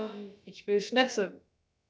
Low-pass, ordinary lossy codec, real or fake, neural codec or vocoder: none; none; fake; codec, 16 kHz, about 1 kbps, DyCAST, with the encoder's durations